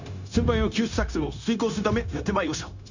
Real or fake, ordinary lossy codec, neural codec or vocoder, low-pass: fake; none; codec, 16 kHz, 0.9 kbps, LongCat-Audio-Codec; 7.2 kHz